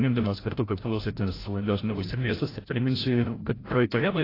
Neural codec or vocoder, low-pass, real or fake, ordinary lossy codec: codec, 16 kHz, 0.5 kbps, FreqCodec, larger model; 5.4 kHz; fake; AAC, 24 kbps